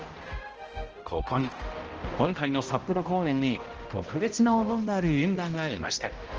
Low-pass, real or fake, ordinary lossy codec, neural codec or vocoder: 7.2 kHz; fake; Opus, 16 kbps; codec, 16 kHz, 0.5 kbps, X-Codec, HuBERT features, trained on balanced general audio